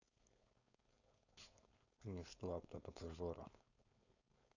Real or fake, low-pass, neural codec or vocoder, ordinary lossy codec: fake; 7.2 kHz; codec, 16 kHz, 4.8 kbps, FACodec; none